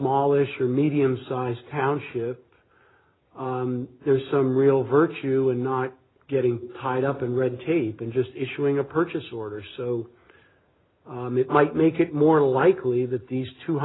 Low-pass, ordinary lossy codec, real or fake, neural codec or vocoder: 7.2 kHz; AAC, 16 kbps; real; none